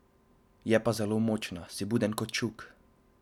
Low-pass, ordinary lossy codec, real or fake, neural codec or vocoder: 19.8 kHz; none; real; none